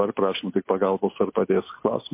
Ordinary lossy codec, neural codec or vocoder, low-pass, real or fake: MP3, 24 kbps; none; 3.6 kHz; real